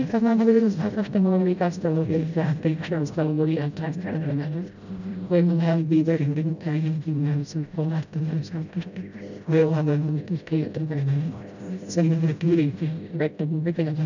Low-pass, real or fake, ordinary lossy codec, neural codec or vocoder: 7.2 kHz; fake; none; codec, 16 kHz, 0.5 kbps, FreqCodec, smaller model